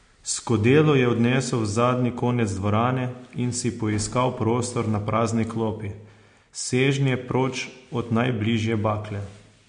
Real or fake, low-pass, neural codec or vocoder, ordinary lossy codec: real; 9.9 kHz; none; MP3, 48 kbps